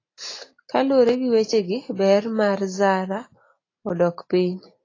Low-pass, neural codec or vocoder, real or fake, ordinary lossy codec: 7.2 kHz; none; real; AAC, 32 kbps